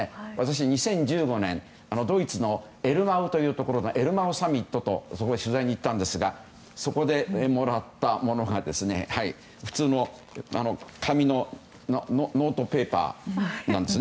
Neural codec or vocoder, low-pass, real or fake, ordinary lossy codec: none; none; real; none